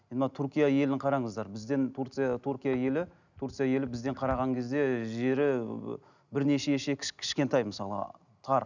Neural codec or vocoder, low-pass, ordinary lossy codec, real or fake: none; 7.2 kHz; none; real